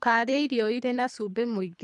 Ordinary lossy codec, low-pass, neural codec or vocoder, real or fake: none; 10.8 kHz; codec, 24 kHz, 3 kbps, HILCodec; fake